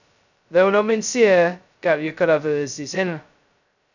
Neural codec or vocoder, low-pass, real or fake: codec, 16 kHz, 0.2 kbps, FocalCodec; 7.2 kHz; fake